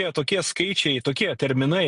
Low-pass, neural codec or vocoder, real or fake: 10.8 kHz; none; real